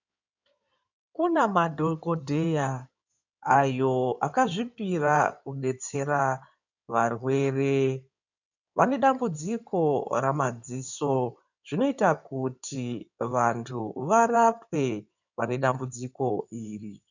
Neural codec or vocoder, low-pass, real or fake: codec, 16 kHz in and 24 kHz out, 2.2 kbps, FireRedTTS-2 codec; 7.2 kHz; fake